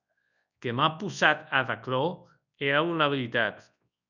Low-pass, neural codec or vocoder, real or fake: 7.2 kHz; codec, 24 kHz, 0.9 kbps, WavTokenizer, large speech release; fake